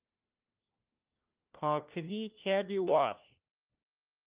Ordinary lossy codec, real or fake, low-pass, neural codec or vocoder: Opus, 32 kbps; fake; 3.6 kHz; codec, 16 kHz, 1 kbps, FunCodec, trained on LibriTTS, 50 frames a second